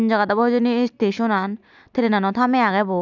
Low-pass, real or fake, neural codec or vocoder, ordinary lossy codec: 7.2 kHz; real; none; none